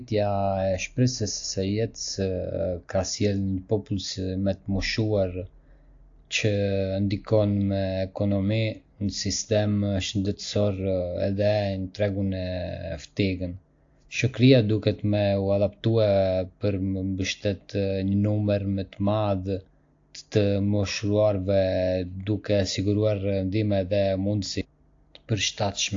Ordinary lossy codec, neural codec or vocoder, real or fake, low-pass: AAC, 48 kbps; none; real; 7.2 kHz